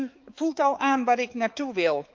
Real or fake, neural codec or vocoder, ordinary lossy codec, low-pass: fake; codec, 16 kHz, 4 kbps, X-Codec, HuBERT features, trained on balanced general audio; Opus, 24 kbps; 7.2 kHz